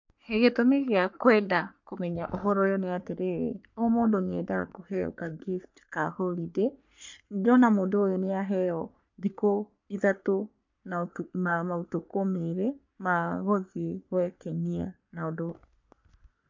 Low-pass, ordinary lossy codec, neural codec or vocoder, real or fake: 7.2 kHz; MP3, 48 kbps; codec, 44.1 kHz, 3.4 kbps, Pupu-Codec; fake